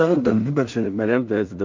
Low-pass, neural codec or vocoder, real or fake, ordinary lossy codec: 7.2 kHz; codec, 16 kHz in and 24 kHz out, 0.4 kbps, LongCat-Audio-Codec, two codebook decoder; fake; MP3, 64 kbps